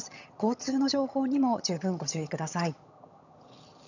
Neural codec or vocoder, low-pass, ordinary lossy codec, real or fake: vocoder, 22.05 kHz, 80 mel bands, HiFi-GAN; 7.2 kHz; none; fake